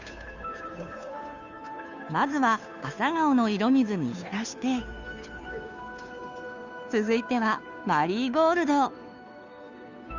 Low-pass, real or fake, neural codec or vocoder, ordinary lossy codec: 7.2 kHz; fake; codec, 16 kHz, 2 kbps, FunCodec, trained on Chinese and English, 25 frames a second; none